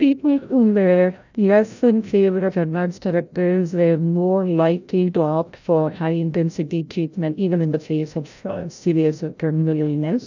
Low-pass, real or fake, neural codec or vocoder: 7.2 kHz; fake; codec, 16 kHz, 0.5 kbps, FreqCodec, larger model